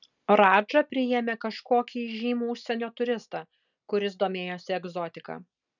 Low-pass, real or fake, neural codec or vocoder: 7.2 kHz; real; none